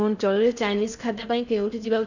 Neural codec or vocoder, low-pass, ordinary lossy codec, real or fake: codec, 16 kHz in and 24 kHz out, 0.6 kbps, FocalCodec, streaming, 4096 codes; 7.2 kHz; none; fake